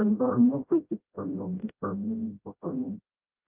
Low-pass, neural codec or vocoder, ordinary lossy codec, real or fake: 3.6 kHz; codec, 16 kHz, 0.5 kbps, FreqCodec, smaller model; Opus, 32 kbps; fake